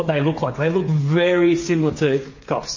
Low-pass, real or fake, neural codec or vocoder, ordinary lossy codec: 7.2 kHz; fake; codec, 16 kHz, 8 kbps, FreqCodec, smaller model; MP3, 32 kbps